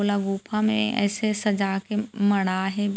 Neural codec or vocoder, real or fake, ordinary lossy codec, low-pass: none; real; none; none